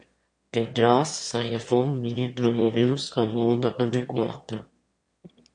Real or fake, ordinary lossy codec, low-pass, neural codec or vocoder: fake; MP3, 48 kbps; 9.9 kHz; autoencoder, 22.05 kHz, a latent of 192 numbers a frame, VITS, trained on one speaker